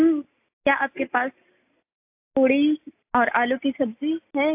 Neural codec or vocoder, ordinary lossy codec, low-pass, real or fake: none; AAC, 32 kbps; 3.6 kHz; real